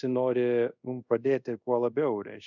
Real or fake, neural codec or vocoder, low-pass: fake; codec, 24 kHz, 0.5 kbps, DualCodec; 7.2 kHz